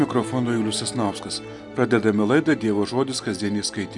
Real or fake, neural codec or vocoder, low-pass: real; none; 10.8 kHz